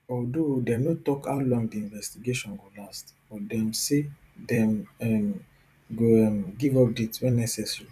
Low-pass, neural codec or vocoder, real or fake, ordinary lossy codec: 14.4 kHz; vocoder, 44.1 kHz, 128 mel bands every 256 samples, BigVGAN v2; fake; none